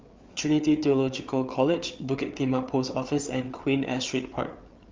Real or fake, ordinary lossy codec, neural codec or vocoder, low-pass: fake; Opus, 32 kbps; codec, 16 kHz, 8 kbps, FreqCodec, larger model; 7.2 kHz